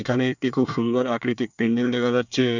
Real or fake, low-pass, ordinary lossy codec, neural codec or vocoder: fake; 7.2 kHz; none; codec, 24 kHz, 1 kbps, SNAC